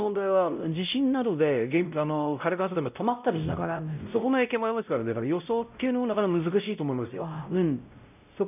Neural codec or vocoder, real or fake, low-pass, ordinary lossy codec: codec, 16 kHz, 0.5 kbps, X-Codec, WavLM features, trained on Multilingual LibriSpeech; fake; 3.6 kHz; none